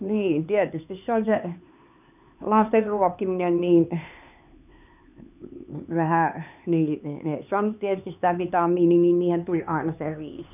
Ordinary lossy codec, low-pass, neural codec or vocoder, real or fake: none; 3.6 kHz; codec, 16 kHz, 4 kbps, X-Codec, HuBERT features, trained on LibriSpeech; fake